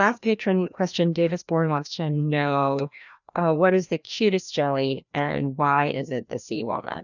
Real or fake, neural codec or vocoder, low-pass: fake; codec, 16 kHz, 1 kbps, FreqCodec, larger model; 7.2 kHz